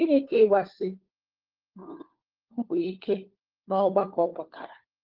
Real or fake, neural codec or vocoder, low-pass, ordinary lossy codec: fake; codec, 16 kHz, 4 kbps, FunCodec, trained on LibriTTS, 50 frames a second; 5.4 kHz; Opus, 16 kbps